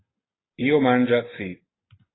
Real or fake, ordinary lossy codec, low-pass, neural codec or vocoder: real; AAC, 16 kbps; 7.2 kHz; none